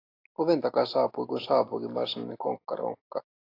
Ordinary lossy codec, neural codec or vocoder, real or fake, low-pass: AAC, 32 kbps; none; real; 5.4 kHz